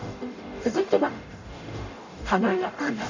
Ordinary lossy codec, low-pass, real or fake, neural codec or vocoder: none; 7.2 kHz; fake; codec, 44.1 kHz, 0.9 kbps, DAC